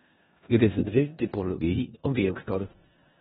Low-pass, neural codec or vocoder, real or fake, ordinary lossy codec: 10.8 kHz; codec, 16 kHz in and 24 kHz out, 0.4 kbps, LongCat-Audio-Codec, four codebook decoder; fake; AAC, 16 kbps